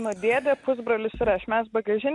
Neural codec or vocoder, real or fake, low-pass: none; real; 10.8 kHz